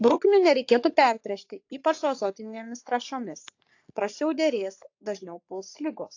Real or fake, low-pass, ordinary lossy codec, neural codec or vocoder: fake; 7.2 kHz; AAC, 48 kbps; codec, 44.1 kHz, 3.4 kbps, Pupu-Codec